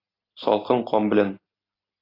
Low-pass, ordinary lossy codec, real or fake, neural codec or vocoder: 5.4 kHz; AAC, 24 kbps; real; none